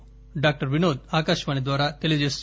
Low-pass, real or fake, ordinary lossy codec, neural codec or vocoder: none; real; none; none